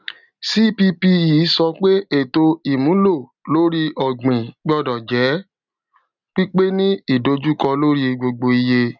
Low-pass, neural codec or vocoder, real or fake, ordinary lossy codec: 7.2 kHz; none; real; none